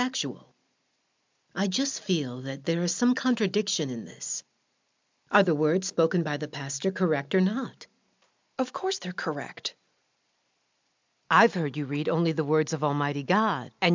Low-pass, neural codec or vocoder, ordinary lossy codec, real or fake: 7.2 kHz; none; MP3, 64 kbps; real